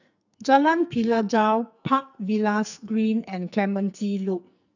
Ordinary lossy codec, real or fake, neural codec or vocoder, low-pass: none; fake; codec, 44.1 kHz, 2.6 kbps, SNAC; 7.2 kHz